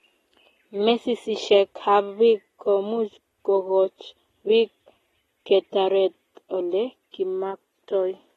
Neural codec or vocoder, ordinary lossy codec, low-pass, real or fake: none; AAC, 32 kbps; 14.4 kHz; real